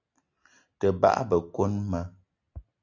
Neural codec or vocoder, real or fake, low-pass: none; real; 7.2 kHz